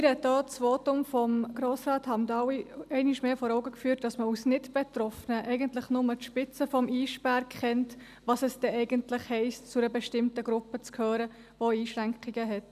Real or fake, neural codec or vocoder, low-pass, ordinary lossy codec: real; none; 14.4 kHz; none